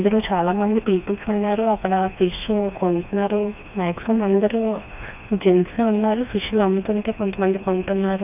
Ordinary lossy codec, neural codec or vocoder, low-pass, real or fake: none; codec, 16 kHz, 2 kbps, FreqCodec, smaller model; 3.6 kHz; fake